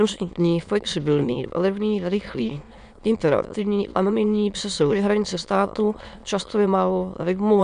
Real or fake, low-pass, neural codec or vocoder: fake; 9.9 kHz; autoencoder, 22.05 kHz, a latent of 192 numbers a frame, VITS, trained on many speakers